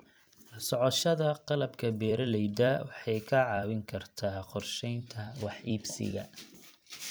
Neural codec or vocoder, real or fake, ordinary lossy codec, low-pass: vocoder, 44.1 kHz, 128 mel bands every 256 samples, BigVGAN v2; fake; none; none